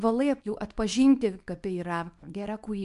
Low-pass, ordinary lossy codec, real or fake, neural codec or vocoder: 10.8 kHz; MP3, 64 kbps; fake; codec, 24 kHz, 0.9 kbps, WavTokenizer, small release